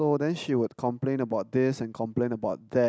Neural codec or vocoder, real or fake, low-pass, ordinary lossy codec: none; real; none; none